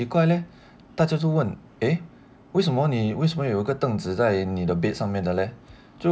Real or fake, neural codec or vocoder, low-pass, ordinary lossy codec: real; none; none; none